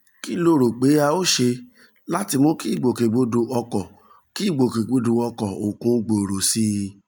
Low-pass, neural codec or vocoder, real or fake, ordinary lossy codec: none; none; real; none